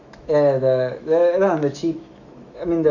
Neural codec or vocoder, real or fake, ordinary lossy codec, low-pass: autoencoder, 48 kHz, 128 numbers a frame, DAC-VAE, trained on Japanese speech; fake; none; 7.2 kHz